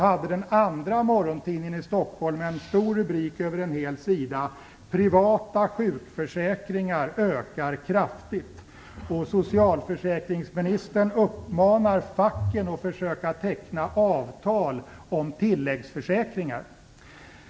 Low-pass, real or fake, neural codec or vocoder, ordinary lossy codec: none; real; none; none